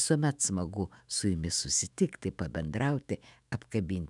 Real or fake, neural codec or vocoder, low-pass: fake; autoencoder, 48 kHz, 128 numbers a frame, DAC-VAE, trained on Japanese speech; 10.8 kHz